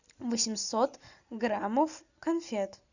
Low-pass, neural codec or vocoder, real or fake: 7.2 kHz; none; real